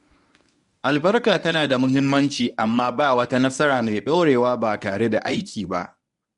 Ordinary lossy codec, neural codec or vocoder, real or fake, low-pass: none; codec, 24 kHz, 0.9 kbps, WavTokenizer, medium speech release version 1; fake; 10.8 kHz